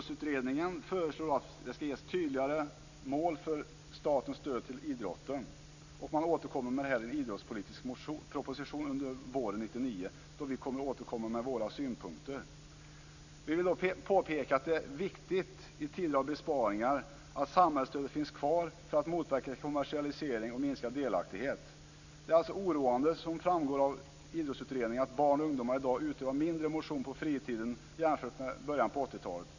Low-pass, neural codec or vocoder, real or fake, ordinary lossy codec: 7.2 kHz; none; real; none